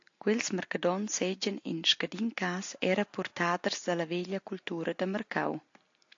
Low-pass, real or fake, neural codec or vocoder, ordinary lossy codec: 7.2 kHz; real; none; AAC, 48 kbps